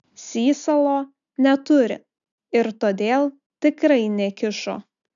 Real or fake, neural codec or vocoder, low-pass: real; none; 7.2 kHz